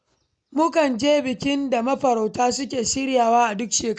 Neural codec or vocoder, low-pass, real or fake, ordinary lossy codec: none; 9.9 kHz; real; none